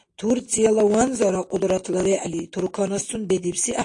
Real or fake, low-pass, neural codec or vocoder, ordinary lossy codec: real; 10.8 kHz; none; AAC, 48 kbps